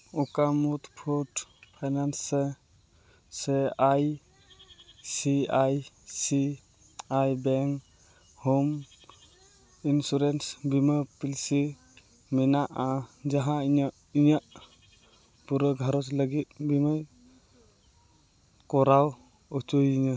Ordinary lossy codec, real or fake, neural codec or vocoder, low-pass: none; real; none; none